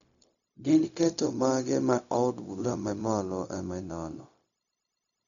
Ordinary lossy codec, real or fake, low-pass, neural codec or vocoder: none; fake; 7.2 kHz; codec, 16 kHz, 0.4 kbps, LongCat-Audio-Codec